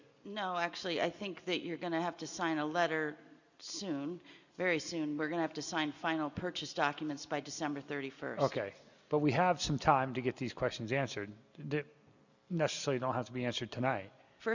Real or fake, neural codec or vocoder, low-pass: real; none; 7.2 kHz